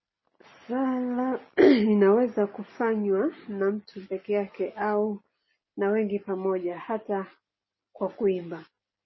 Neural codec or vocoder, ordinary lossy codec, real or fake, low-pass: none; MP3, 24 kbps; real; 7.2 kHz